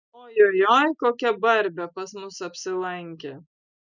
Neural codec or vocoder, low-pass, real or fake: none; 7.2 kHz; real